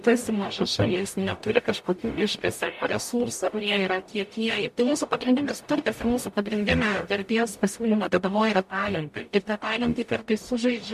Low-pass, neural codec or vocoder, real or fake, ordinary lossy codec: 14.4 kHz; codec, 44.1 kHz, 0.9 kbps, DAC; fake; MP3, 64 kbps